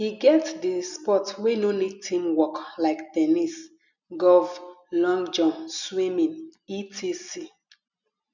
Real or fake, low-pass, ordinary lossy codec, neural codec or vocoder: real; 7.2 kHz; none; none